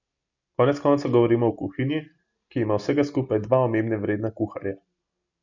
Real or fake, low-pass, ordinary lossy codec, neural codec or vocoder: real; 7.2 kHz; none; none